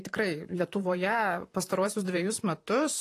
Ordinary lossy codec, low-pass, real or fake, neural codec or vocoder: AAC, 48 kbps; 14.4 kHz; fake; vocoder, 44.1 kHz, 128 mel bands, Pupu-Vocoder